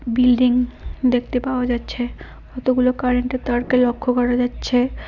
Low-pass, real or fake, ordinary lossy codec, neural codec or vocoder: 7.2 kHz; real; none; none